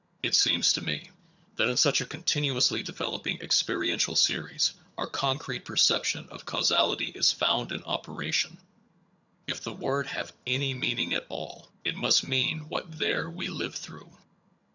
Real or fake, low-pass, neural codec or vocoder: fake; 7.2 kHz; vocoder, 22.05 kHz, 80 mel bands, HiFi-GAN